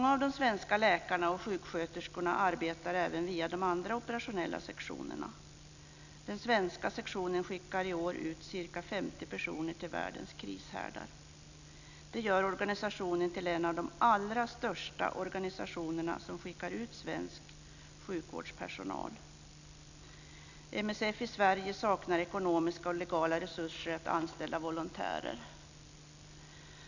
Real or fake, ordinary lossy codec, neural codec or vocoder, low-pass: real; none; none; 7.2 kHz